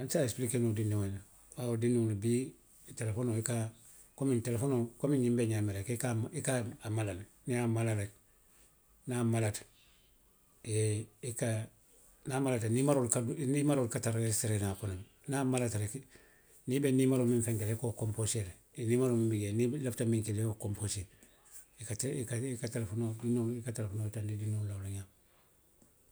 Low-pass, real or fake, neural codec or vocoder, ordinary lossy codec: none; real; none; none